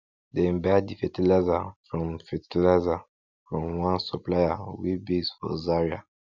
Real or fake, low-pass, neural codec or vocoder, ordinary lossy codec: real; 7.2 kHz; none; none